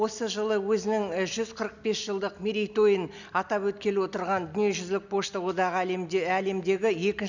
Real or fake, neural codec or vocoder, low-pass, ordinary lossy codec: real; none; 7.2 kHz; none